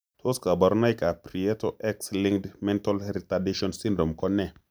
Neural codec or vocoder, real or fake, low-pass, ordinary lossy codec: none; real; none; none